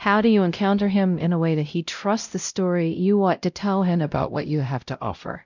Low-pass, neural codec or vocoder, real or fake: 7.2 kHz; codec, 16 kHz, 0.5 kbps, X-Codec, WavLM features, trained on Multilingual LibriSpeech; fake